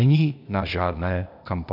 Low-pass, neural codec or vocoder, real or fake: 5.4 kHz; codec, 16 kHz, 0.8 kbps, ZipCodec; fake